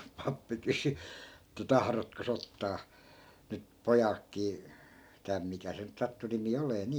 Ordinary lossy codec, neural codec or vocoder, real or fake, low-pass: none; none; real; none